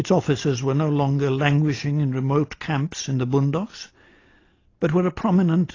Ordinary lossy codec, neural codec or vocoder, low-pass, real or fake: AAC, 32 kbps; none; 7.2 kHz; real